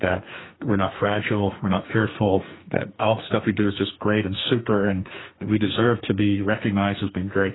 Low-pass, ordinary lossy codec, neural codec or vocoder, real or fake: 7.2 kHz; AAC, 16 kbps; codec, 44.1 kHz, 2.6 kbps, SNAC; fake